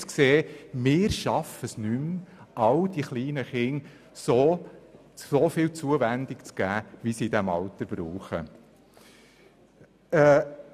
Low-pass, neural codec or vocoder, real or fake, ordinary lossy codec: 14.4 kHz; none; real; none